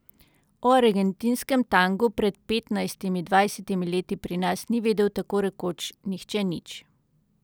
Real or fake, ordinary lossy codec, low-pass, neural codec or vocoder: real; none; none; none